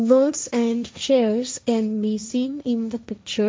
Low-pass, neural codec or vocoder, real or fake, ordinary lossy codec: none; codec, 16 kHz, 1.1 kbps, Voila-Tokenizer; fake; none